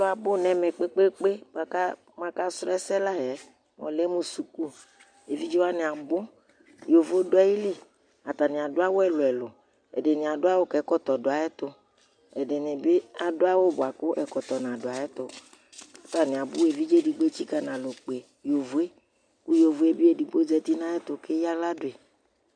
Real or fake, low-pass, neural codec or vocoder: real; 9.9 kHz; none